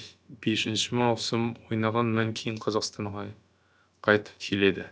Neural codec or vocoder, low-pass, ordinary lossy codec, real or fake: codec, 16 kHz, about 1 kbps, DyCAST, with the encoder's durations; none; none; fake